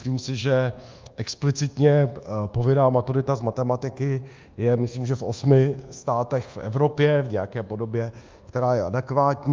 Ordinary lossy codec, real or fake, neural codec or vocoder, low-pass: Opus, 32 kbps; fake; codec, 24 kHz, 1.2 kbps, DualCodec; 7.2 kHz